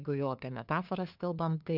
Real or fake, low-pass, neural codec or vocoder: fake; 5.4 kHz; codec, 16 kHz, 2 kbps, FunCodec, trained on LibriTTS, 25 frames a second